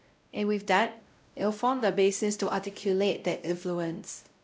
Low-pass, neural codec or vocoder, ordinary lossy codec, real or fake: none; codec, 16 kHz, 0.5 kbps, X-Codec, WavLM features, trained on Multilingual LibriSpeech; none; fake